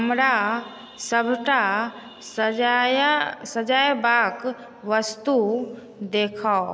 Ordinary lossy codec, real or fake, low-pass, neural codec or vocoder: none; real; none; none